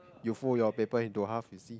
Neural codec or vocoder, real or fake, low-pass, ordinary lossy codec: none; real; none; none